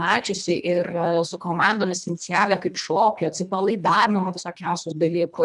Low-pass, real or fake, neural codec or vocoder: 10.8 kHz; fake; codec, 24 kHz, 1.5 kbps, HILCodec